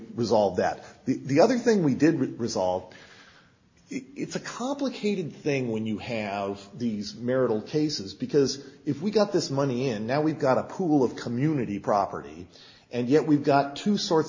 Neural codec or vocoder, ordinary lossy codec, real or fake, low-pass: none; MP3, 32 kbps; real; 7.2 kHz